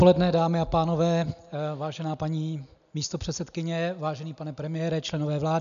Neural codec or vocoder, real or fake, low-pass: none; real; 7.2 kHz